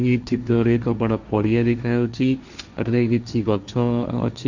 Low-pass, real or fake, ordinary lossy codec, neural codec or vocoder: 7.2 kHz; fake; Opus, 64 kbps; codec, 16 kHz, 1.1 kbps, Voila-Tokenizer